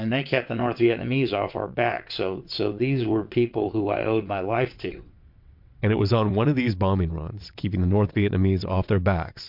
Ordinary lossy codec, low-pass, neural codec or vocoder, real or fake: MP3, 48 kbps; 5.4 kHz; vocoder, 22.05 kHz, 80 mel bands, WaveNeXt; fake